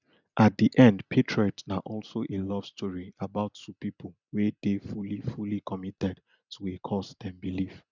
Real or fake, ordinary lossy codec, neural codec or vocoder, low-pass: real; none; none; 7.2 kHz